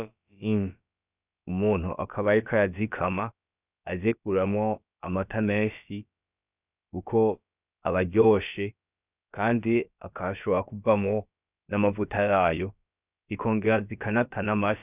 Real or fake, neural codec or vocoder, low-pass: fake; codec, 16 kHz, about 1 kbps, DyCAST, with the encoder's durations; 3.6 kHz